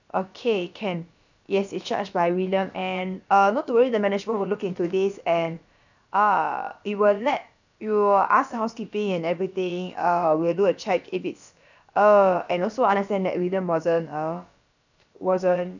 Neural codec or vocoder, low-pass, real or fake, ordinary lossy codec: codec, 16 kHz, about 1 kbps, DyCAST, with the encoder's durations; 7.2 kHz; fake; none